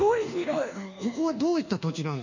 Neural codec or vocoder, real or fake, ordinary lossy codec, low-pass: codec, 24 kHz, 1.2 kbps, DualCodec; fake; none; 7.2 kHz